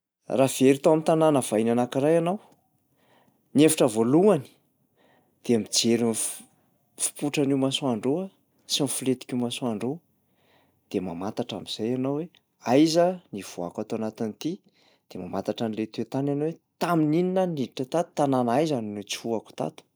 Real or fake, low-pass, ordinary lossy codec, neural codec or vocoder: real; none; none; none